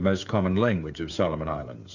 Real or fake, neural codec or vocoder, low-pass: fake; codec, 16 kHz, 8 kbps, FreqCodec, smaller model; 7.2 kHz